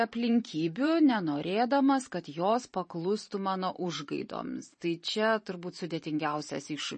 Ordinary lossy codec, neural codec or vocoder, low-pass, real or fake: MP3, 32 kbps; vocoder, 44.1 kHz, 128 mel bands every 512 samples, BigVGAN v2; 10.8 kHz; fake